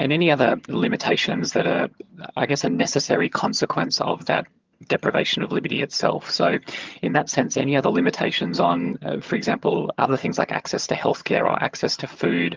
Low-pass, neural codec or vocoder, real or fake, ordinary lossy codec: 7.2 kHz; vocoder, 22.05 kHz, 80 mel bands, HiFi-GAN; fake; Opus, 32 kbps